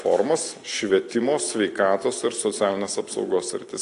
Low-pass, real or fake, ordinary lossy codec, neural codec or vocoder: 10.8 kHz; real; AAC, 48 kbps; none